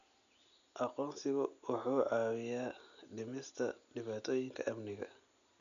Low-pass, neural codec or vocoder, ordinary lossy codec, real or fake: 7.2 kHz; none; none; real